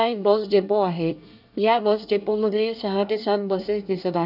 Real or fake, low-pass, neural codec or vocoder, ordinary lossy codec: fake; 5.4 kHz; codec, 24 kHz, 1 kbps, SNAC; none